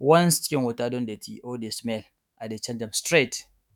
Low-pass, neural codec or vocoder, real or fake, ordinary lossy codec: 19.8 kHz; autoencoder, 48 kHz, 128 numbers a frame, DAC-VAE, trained on Japanese speech; fake; none